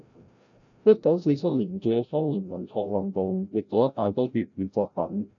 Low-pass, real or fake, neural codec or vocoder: 7.2 kHz; fake; codec, 16 kHz, 0.5 kbps, FreqCodec, larger model